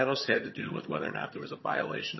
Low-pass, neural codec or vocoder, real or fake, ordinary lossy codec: 7.2 kHz; vocoder, 22.05 kHz, 80 mel bands, HiFi-GAN; fake; MP3, 24 kbps